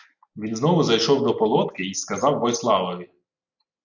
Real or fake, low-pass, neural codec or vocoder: real; 7.2 kHz; none